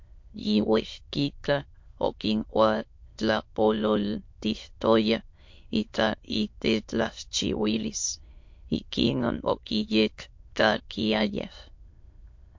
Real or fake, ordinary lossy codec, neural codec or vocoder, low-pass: fake; MP3, 48 kbps; autoencoder, 22.05 kHz, a latent of 192 numbers a frame, VITS, trained on many speakers; 7.2 kHz